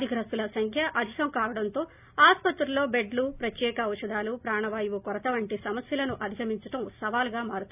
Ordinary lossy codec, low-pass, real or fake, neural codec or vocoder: none; 3.6 kHz; real; none